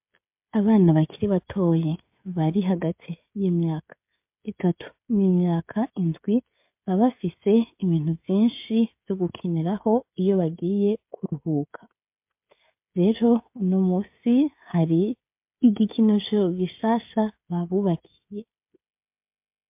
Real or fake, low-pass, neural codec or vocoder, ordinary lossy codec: fake; 3.6 kHz; codec, 16 kHz, 16 kbps, FreqCodec, smaller model; MP3, 32 kbps